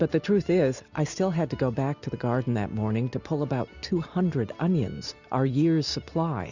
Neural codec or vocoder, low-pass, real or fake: none; 7.2 kHz; real